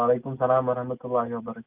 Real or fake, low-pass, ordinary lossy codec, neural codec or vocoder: real; 3.6 kHz; Opus, 32 kbps; none